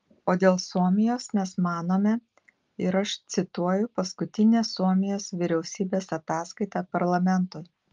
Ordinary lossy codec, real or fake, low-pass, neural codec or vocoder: Opus, 32 kbps; real; 7.2 kHz; none